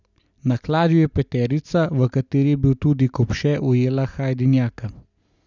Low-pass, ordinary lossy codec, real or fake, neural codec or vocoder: 7.2 kHz; none; real; none